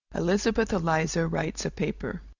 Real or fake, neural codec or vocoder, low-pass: real; none; 7.2 kHz